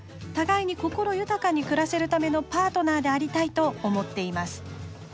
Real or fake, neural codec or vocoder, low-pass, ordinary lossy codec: real; none; none; none